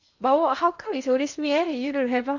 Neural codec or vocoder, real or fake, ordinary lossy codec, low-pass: codec, 16 kHz in and 24 kHz out, 0.8 kbps, FocalCodec, streaming, 65536 codes; fake; none; 7.2 kHz